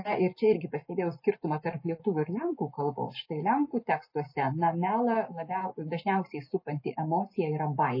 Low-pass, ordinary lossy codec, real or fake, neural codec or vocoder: 5.4 kHz; MP3, 24 kbps; real; none